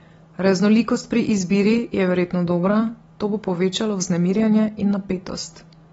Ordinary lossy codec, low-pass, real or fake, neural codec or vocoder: AAC, 24 kbps; 19.8 kHz; real; none